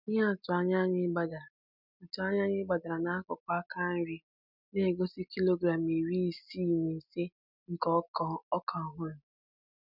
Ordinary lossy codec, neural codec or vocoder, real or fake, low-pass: none; none; real; 5.4 kHz